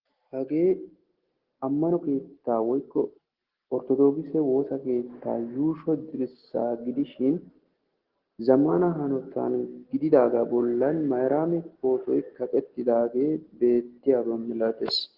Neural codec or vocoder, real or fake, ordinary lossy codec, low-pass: none; real; Opus, 16 kbps; 5.4 kHz